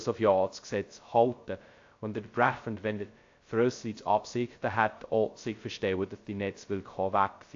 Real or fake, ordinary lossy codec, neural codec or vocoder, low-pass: fake; AAC, 48 kbps; codec, 16 kHz, 0.2 kbps, FocalCodec; 7.2 kHz